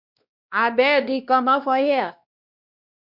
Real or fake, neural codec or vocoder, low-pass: fake; codec, 16 kHz, 1 kbps, X-Codec, WavLM features, trained on Multilingual LibriSpeech; 5.4 kHz